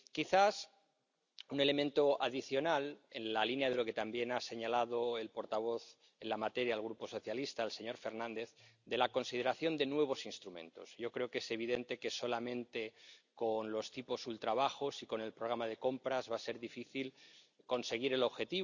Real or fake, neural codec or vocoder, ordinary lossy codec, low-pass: real; none; none; 7.2 kHz